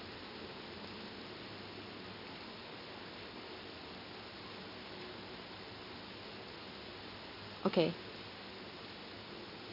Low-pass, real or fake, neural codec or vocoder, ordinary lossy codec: 5.4 kHz; real; none; none